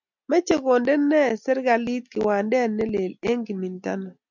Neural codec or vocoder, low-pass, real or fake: none; 7.2 kHz; real